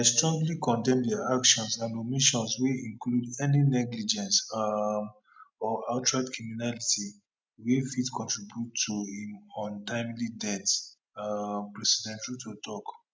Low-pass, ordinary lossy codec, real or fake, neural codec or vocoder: none; none; real; none